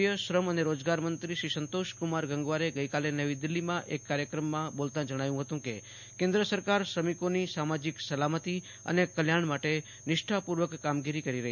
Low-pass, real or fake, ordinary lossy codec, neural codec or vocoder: 7.2 kHz; real; none; none